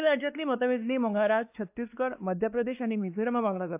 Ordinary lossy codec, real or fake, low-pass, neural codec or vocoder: AAC, 32 kbps; fake; 3.6 kHz; codec, 16 kHz, 2 kbps, X-Codec, WavLM features, trained on Multilingual LibriSpeech